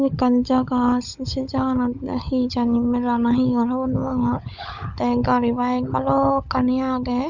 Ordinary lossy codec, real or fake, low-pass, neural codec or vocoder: none; fake; 7.2 kHz; codec, 16 kHz, 16 kbps, FunCodec, trained on LibriTTS, 50 frames a second